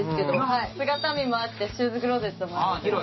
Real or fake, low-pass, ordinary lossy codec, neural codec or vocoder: real; 7.2 kHz; MP3, 24 kbps; none